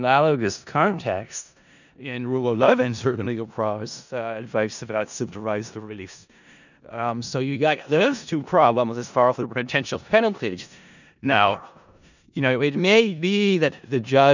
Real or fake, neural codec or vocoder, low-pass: fake; codec, 16 kHz in and 24 kHz out, 0.4 kbps, LongCat-Audio-Codec, four codebook decoder; 7.2 kHz